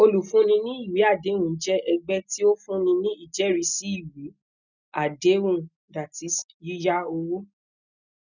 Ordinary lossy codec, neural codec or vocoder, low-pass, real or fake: none; none; 7.2 kHz; real